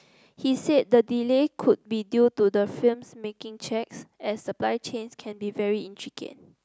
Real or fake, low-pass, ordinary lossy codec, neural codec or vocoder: real; none; none; none